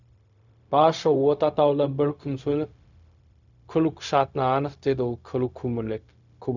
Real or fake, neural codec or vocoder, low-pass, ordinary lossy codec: fake; codec, 16 kHz, 0.4 kbps, LongCat-Audio-Codec; 7.2 kHz; none